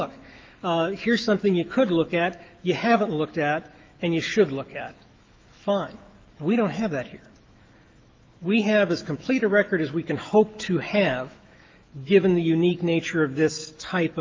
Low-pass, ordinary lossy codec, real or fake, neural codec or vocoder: 7.2 kHz; Opus, 24 kbps; fake; autoencoder, 48 kHz, 128 numbers a frame, DAC-VAE, trained on Japanese speech